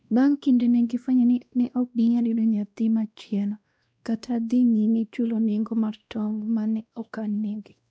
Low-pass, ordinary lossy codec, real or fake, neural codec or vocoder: none; none; fake; codec, 16 kHz, 1 kbps, X-Codec, WavLM features, trained on Multilingual LibriSpeech